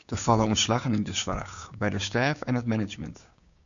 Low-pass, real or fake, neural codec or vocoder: 7.2 kHz; fake; codec, 16 kHz, 6 kbps, DAC